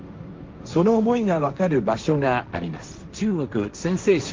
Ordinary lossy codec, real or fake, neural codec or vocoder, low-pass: Opus, 32 kbps; fake; codec, 16 kHz, 1.1 kbps, Voila-Tokenizer; 7.2 kHz